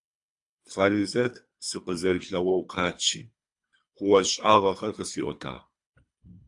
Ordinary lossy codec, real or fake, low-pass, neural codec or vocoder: AAC, 64 kbps; fake; 10.8 kHz; codec, 24 kHz, 3 kbps, HILCodec